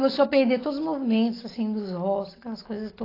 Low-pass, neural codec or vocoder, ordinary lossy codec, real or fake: 5.4 kHz; none; AAC, 24 kbps; real